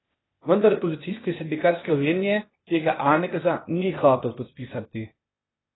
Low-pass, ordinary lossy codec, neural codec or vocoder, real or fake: 7.2 kHz; AAC, 16 kbps; codec, 16 kHz, 0.8 kbps, ZipCodec; fake